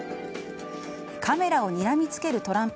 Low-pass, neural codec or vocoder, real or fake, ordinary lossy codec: none; none; real; none